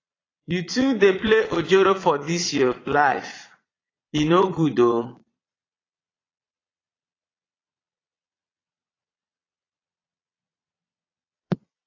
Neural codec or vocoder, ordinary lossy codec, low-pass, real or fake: vocoder, 22.05 kHz, 80 mel bands, Vocos; AAC, 32 kbps; 7.2 kHz; fake